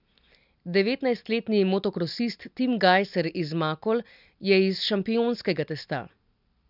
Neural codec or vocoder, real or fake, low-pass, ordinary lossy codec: none; real; 5.4 kHz; none